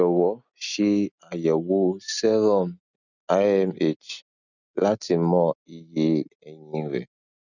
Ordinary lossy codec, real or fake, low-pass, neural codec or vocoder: none; real; 7.2 kHz; none